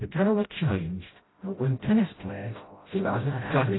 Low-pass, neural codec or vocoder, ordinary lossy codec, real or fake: 7.2 kHz; codec, 16 kHz, 0.5 kbps, FreqCodec, smaller model; AAC, 16 kbps; fake